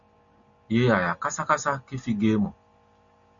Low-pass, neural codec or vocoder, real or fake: 7.2 kHz; none; real